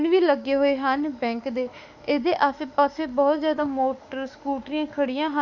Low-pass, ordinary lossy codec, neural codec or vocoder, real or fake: 7.2 kHz; none; autoencoder, 48 kHz, 32 numbers a frame, DAC-VAE, trained on Japanese speech; fake